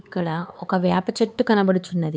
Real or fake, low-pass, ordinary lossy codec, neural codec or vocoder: fake; none; none; codec, 16 kHz, 4 kbps, X-Codec, HuBERT features, trained on LibriSpeech